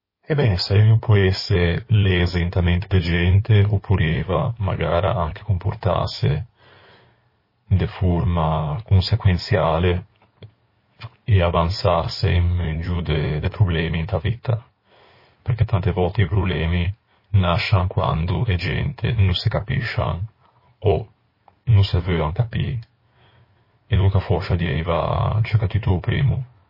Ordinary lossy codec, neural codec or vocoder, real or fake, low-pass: MP3, 24 kbps; codec, 16 kHz in and 24 kHz out, 2.2 kbps, FireRedTTS-2 codec; fake; 5.4 kHz